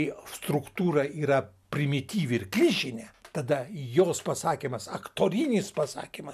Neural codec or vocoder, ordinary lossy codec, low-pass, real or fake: none; MP3, 96 kbps; 14.4 kHz; real